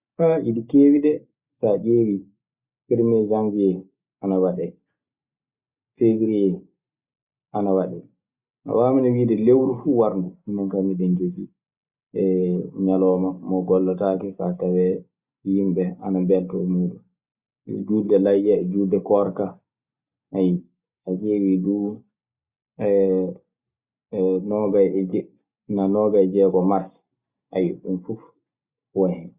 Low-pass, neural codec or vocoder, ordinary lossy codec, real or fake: 3.6 kHz; none; Opus, 64 kbps; real